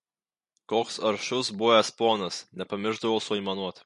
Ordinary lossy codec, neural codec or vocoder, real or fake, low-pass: MP3, 48 kbps; none; real; 14.4 kHz